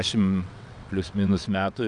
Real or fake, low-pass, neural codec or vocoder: fake; 9.9 kHz; vocoder, 22.05 kHz, 80 mel bands, WaveNeXt